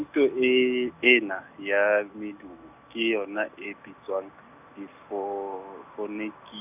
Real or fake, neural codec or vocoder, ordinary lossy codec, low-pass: real; none; none; 3.6 kHz